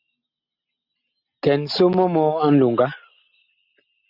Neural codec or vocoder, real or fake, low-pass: none; real; 5.4 kHz